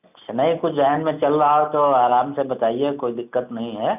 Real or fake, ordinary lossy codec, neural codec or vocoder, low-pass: real; none; none; 3.6 kHz